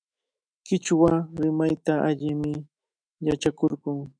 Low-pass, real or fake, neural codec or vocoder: 9.9 kHz; fake; autoencoder, 48 kHz, 128 numbers a frame, DAC-VAE, trained on Japanese speech